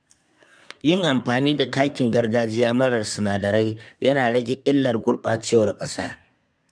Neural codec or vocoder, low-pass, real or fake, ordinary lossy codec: codec, 24 kHz, 1 kbps, SNAC; 9.9 kHz; fake; none